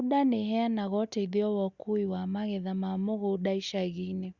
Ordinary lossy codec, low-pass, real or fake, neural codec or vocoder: Opus, 64 kbps; 7.2 kHz; real; none